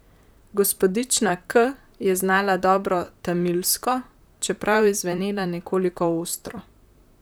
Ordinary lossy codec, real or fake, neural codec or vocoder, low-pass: none; fake; vocoder, 44.1 kHz, 128 mel bands, Pupu-Vocoder; none